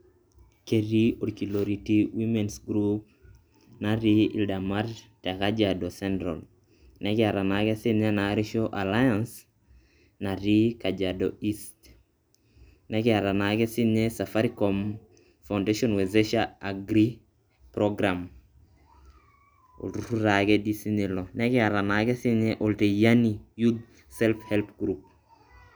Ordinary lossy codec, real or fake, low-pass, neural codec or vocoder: none; real; none; none